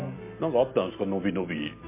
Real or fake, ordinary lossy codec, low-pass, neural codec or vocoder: real; MP3, 24 kbps; 3.6 kHz; none